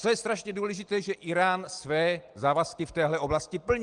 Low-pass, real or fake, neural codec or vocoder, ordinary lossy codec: 9.9 kHz; real; none; Opus, 24 kbps